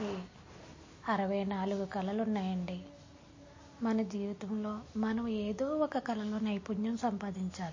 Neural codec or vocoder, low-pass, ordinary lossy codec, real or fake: none; 7.2 kHz; MP3, 32 kbps; real